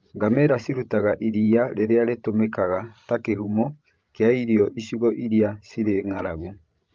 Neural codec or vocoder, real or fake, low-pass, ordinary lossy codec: codec, 16 kHz, 16 kbps, FreqCodec, larger model; fake; 7.2 kHz; Opus, 24 kbps